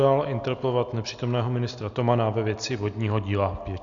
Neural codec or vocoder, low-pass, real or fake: none; 7.2 kHz; real